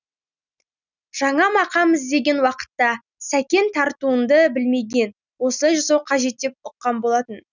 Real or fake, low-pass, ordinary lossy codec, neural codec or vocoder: real; 7.2 kHz; none; none